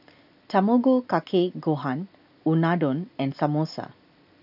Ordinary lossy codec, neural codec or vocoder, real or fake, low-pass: none; none; real; 5.4 kHz